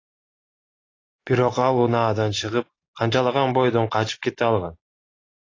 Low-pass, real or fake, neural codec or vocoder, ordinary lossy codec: 7.2 kHz; real; none; AAC, 32 kbps